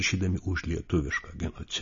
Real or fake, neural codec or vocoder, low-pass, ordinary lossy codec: real; none; 7.2 kHz; MP3, 32 kbps